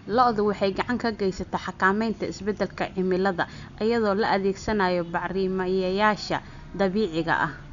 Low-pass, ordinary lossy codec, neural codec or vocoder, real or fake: 7.2 kHz; none; none; real